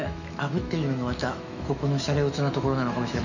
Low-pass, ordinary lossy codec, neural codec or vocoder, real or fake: 7.2 kHz; none; autoencoder, 48 kHz, 128 numbers a frame, DAC-VAE, trained on Japanese speech; fake